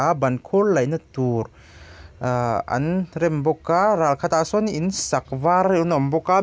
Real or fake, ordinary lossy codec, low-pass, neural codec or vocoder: real; none; none; none